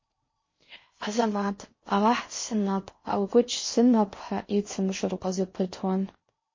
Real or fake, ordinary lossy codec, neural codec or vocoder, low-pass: fake; MP3, 32 kbps; codec, 16 kHz in and 24 kHz out, 0.6 kbps, FocalCodec, streaming, 4096 codes; 7.2 kHz